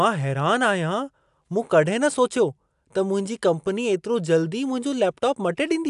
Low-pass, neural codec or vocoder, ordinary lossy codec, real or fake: 10.8 kHz; none; AAC, 96 kbps; real